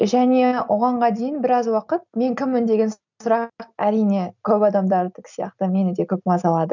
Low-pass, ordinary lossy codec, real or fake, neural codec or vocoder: 7.2 kHz; none; real; none